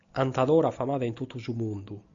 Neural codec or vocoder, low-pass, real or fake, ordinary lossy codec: none; 7.2 kHz; real; AAC, 64 kbps